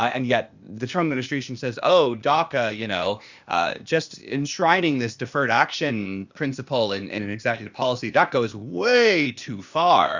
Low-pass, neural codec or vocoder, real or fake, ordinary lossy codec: 7.2 kHz; codec, 16 kHz, 0.8 kbps, ZipCodec; fake; Opus, 64 kbps